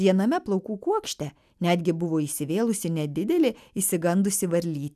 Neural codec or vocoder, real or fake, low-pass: none; real; 14.4 kHz